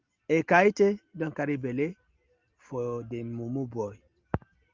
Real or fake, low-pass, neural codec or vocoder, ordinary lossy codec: real; 7.2 kHz; none; Opus, 32 kbps